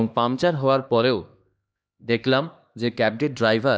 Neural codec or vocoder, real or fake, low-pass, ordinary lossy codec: codec, 16 kHz, 2 kbps, X-Codec, HuBERT features, trained on LibriSpeech; fake; none; none